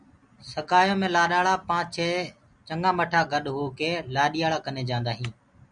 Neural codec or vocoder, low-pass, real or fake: none; 9.9 kHz; real